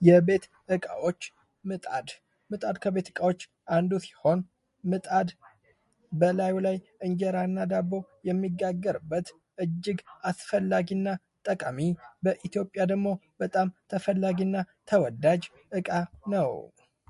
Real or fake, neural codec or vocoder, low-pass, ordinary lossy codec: real; none; 14.4 kHz; MP3, 48 kbps